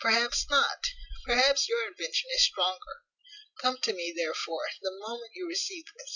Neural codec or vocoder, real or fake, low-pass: none; real; 7.2 kHz